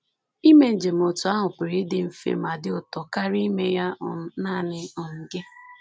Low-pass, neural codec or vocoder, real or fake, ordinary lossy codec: none; none; real; none